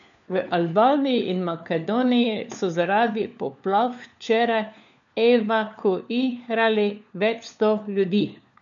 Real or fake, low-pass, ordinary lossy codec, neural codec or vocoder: fake; 7.2 kHz; none; codec, 16 kHz, 4 kbps, FunCodec, trained on LibriTTS, 50 frames a second